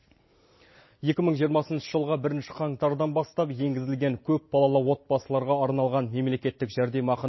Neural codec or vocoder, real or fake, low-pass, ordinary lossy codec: none; real; 7.2 kHz; MP3, 24 kbps